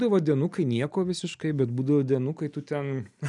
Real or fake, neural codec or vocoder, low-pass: real; none; 10.8 kHz